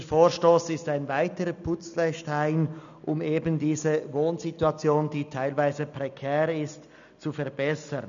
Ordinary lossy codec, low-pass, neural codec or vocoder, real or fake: none; 7.2 kHz; none; real